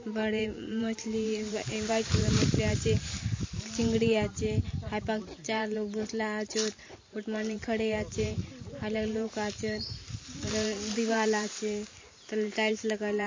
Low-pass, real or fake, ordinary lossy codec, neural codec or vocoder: 7.2 kHz; fake; MP3, 32 kbps; vocoder, 44.1 kHz, 128 mel bands every 512 samples, BigVGAN v2